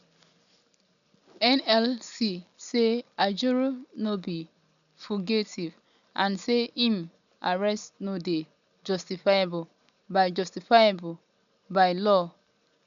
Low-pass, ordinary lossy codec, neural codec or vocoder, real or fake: 7.2 kHz; none; none; real